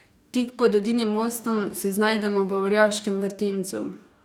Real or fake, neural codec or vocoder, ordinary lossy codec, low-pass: fake; codec, 44.1 kHz, 2.6 kbps, DAC; none; 19.8 kHz